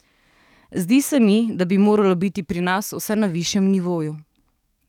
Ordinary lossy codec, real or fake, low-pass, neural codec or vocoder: none; fake; 19.8 kHz; codec, 44.1 kHz, 7.8 kbps, DAC